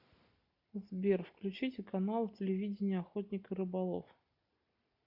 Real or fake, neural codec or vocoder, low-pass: real; none; 5.4 kHz